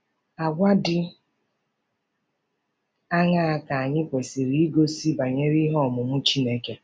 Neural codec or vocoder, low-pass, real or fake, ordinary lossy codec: none; none; real; none